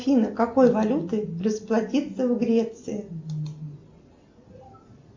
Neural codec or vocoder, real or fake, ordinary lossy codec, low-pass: none; real; MP3, 48 kbps; 7.2 kHz